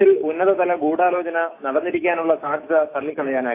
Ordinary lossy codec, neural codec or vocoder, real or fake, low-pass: AAC, 24 kbps; autoencoder, 48 kHz, 128 numbers a frame, DAC-VAE, trained on Japanese speech; fake; 3.6 kHz